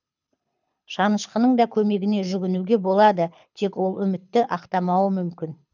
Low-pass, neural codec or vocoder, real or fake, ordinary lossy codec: 7.2 kHz; codec, 24 kHz, 6 kbps, HILCodec; fake; none